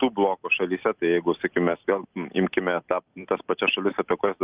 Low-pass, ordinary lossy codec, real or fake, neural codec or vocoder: 3.6 kHz; Opus, 24 kbps; real; none